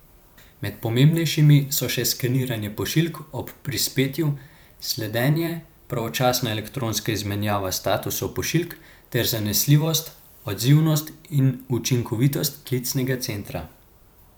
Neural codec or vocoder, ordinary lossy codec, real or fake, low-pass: vocoder, 44.1 kHz, 128 mel bands every 512 samples, BigVGAN v2; none; fake; none